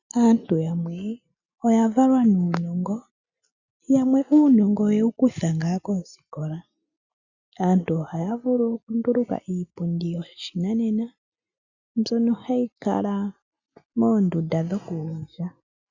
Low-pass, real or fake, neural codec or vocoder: 7.2 kHz; real; none